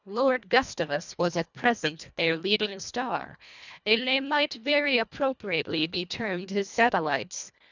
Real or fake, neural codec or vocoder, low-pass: fake; codec, 24 kHz, 1.5 kbps, HILCodec; 7.2 kHz